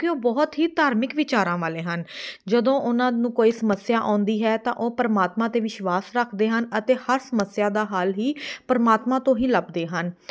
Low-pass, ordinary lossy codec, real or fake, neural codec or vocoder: none; none; real; none